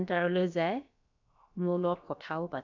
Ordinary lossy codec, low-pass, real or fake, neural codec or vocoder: none; 7.2 kHz; fake; codec, 16 kHz, about 1 kbps, DyCAST, with the encoder's durations